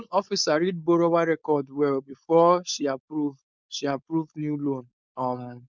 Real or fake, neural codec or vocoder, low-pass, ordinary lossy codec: fake; codec, 16 kHz, 4.8 kbps, FACodec; none; none